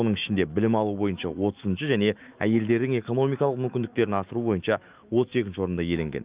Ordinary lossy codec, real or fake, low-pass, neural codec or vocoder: Opus, 32 kbps; fake; 3.6 kHz; autoencoder, 48 kHz, 128 numbers a frame, DAC-VAE, trained on Japanese speech